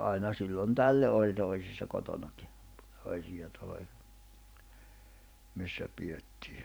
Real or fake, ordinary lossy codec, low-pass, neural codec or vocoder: fake; none; none; vocoder, 44.1 kHz, 128 mel bands every 512 samples, BigVGAN v2